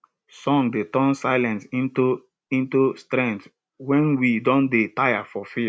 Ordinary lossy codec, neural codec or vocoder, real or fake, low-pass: none; none; real; none